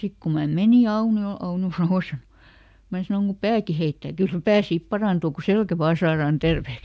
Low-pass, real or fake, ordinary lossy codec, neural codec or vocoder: none; real; none; none